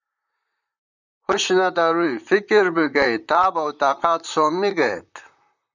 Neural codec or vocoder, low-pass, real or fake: vocoder, 44.1 kHz, 128 mel bands, Pupu-Vocoder; 7.2 kHz; fake